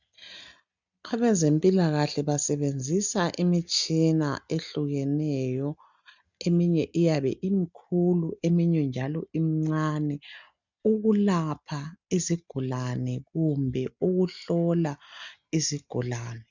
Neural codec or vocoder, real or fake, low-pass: none; real; 7.2 kHz